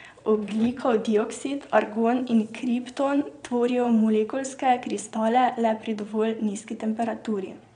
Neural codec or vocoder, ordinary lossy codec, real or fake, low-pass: vocoder, 22.05 kHz, 80 mel bands, Vocos; none; fake; 9.9 kHz